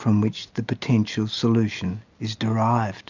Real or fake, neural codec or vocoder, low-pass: real; none; 7.2 kHz